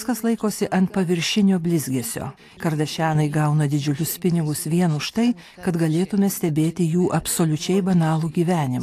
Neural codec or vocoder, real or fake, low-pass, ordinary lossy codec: none; real; 14.4 kHz; AAC, 64 kbps